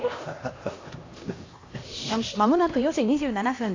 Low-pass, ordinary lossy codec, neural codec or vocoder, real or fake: 7.2 kHz; AAC, 32 kbps; codec, 16 kHz, 1 kbps, X-Codec, HuBERT features, trained on LibriSpeech; fake